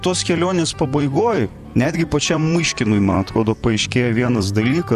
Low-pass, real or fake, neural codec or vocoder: 14.4 kHz; fake; vocoder, 44.1 kHz, 128 mel bands, Pupu-Vocoder